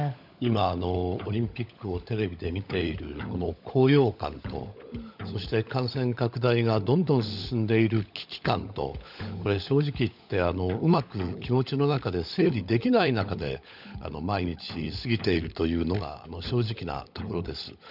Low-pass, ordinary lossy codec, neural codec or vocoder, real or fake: 5.4 kHz; none; codec, 16 kHz, 16 kbps, FunCodec, trained on LibriTTS, 50 frames a second; fake